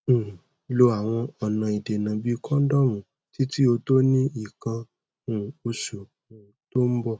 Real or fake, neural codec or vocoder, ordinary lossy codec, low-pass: real; none; none; none